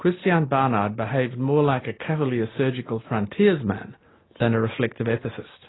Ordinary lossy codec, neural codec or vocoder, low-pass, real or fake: AAC, 16 kbps; none; 7.2 kHz; real